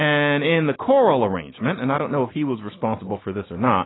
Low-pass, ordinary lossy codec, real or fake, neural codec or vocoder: 7.2 kHz; AAC, 16 kbps; real; none